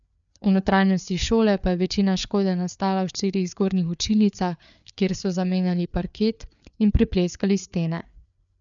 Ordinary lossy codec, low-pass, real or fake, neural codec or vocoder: none; 7.2 kHz; fake; codec, 16 kHz, 4 kbps, FreqCodec, larger model